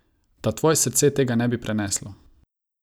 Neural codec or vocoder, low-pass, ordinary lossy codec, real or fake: none; none; none; real